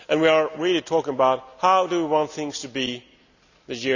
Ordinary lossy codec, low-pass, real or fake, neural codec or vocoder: none; 7.2 kHz; real; none